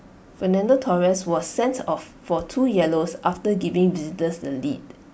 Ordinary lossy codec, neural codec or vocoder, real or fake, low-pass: none; none; real; none